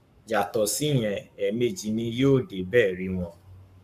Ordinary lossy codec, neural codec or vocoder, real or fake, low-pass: none; codec, 44.1 kHz, 7.8 kbps, Pupu-Codec; fake; 14.4 kHz